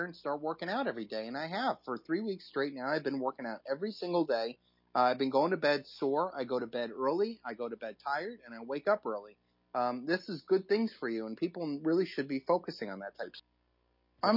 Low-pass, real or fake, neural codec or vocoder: 5.4 kHz; real; none